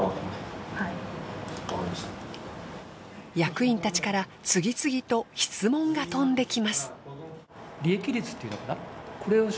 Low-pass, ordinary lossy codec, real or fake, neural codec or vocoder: none; none; real; none